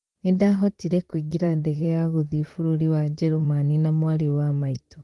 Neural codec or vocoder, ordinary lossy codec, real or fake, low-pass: vocoder, 44.1 kHz, 128 mel bands, Pupu-Vocoder; Opus, 24 kbps; fake; 10.8 kHz